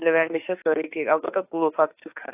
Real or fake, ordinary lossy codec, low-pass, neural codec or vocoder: fake; AAC, 16 kbps; 3.6 kHz; autoencoder, 48 kHz, 32 numbers a frame, DAC-VAE, trained on Japanese speech